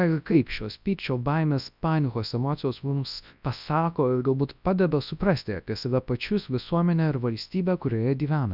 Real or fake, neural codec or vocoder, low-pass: fake; codec, 24 kHz, 0.9 kbps, WavTokenizer, large speech release; 5.4 kHz